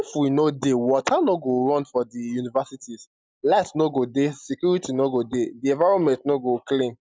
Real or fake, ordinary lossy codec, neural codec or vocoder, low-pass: real; none; none; none